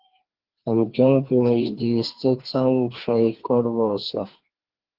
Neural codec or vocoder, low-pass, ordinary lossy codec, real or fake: codec, 16 kHz, 2 kbps, FreqCodec, larger model; 5.4 kHz; Opus, 16 kbps; fake